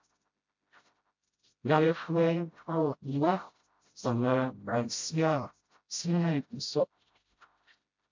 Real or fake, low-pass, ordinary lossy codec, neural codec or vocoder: fake; 7.2 kHz; MP3, 48 kbps; codec, 16 kHz, 0.5 kbps, FreqCodec, smaller model